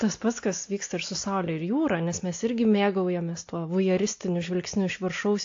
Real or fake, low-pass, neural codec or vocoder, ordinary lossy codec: real; 7.2 kHz; none; AAC, 48 kbps